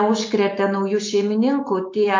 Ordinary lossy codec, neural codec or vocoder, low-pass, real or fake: MP3, 48 kbps; none; 7.2 kHz; real